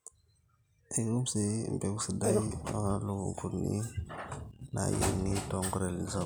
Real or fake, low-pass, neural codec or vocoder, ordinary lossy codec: real; none; none; none